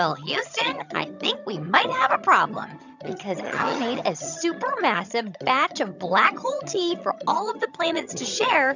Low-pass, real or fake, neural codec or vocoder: 7.2 kHz; fake; vocoder, 22.05 kHz, 80 mel bands, HiFi-GAN